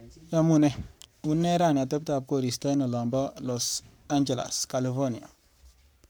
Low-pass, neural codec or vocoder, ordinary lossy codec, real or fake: none; codec, 44.1 kHz, 7.8 kbps, Pupu-Codec; none; fake